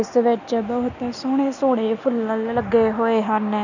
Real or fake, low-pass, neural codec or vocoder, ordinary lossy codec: real; 7.2 kHz; none; none